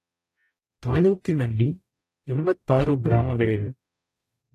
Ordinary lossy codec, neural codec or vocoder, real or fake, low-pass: AAC, 64 kbps; codec, 44.1 kHz, 0.9 kbps, DAC; fake; 14.4 kHz